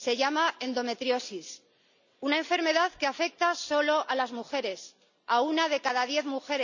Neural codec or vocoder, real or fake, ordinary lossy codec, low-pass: none; real; none; 7.2 kHz